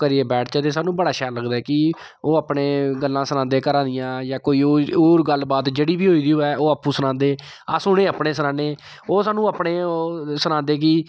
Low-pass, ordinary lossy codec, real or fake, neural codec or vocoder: none; none; real; none